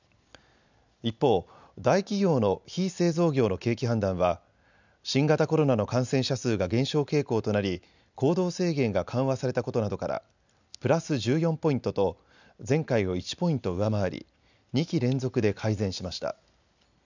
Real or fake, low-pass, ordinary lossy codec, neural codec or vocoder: real; 7.2 kHz; none; none